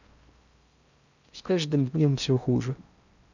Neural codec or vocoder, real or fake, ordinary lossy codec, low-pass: codec, 16 kHz in and 24 kHz out, 0.6 kbps, FocalCodec, streaming, 4096 codes; fake; none; 7.2 kHz